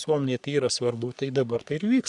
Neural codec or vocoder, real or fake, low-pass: codec, 44.1 kHz, 3.4 kbps, Pupu-Codec; fake; 10.8 kHz